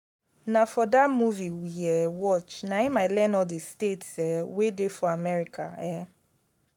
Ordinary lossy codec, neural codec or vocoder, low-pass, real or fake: none; codec, 44.1 kHz, 7.8 kbps, Pupu-Codec; 19.8 kHz; fake